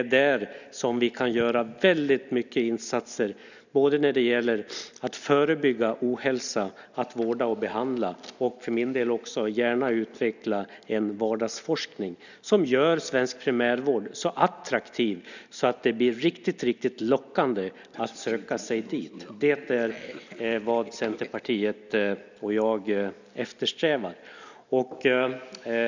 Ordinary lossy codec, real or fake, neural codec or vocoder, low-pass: none; real; none; 7.2 kHz